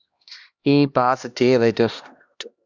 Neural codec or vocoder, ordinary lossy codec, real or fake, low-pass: codec, 16 kHz, 1 kbps, X-Codec, HuBERT features, trained on LibriSpeech; Opus, 64 kbps; fake; 7.2 kHz